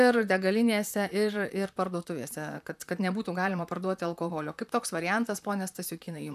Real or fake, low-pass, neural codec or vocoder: fake; 14.4 kHz; vocoder, 44.1 kHz, 128 mel bands, Pupu-Vocoder